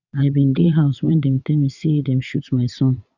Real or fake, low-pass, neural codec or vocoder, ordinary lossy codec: fake; 7.2 kHz; vocoder, 22.05 kHz, 80 mel bands, WaveNeXt; none